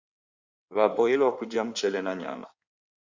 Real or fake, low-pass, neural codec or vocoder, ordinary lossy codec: fake; 7.2 kHz; codec, 16 kHz in and 24 kHz out, 2.2 kbps, FireRedTTS-2 codec; Opus, 64 kbps